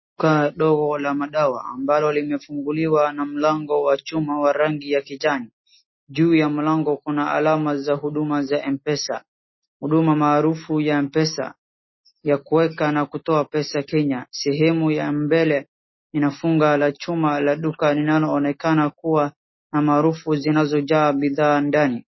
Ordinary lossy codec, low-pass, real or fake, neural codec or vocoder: MP3, 24 kbps; 7.2 kHz; real; none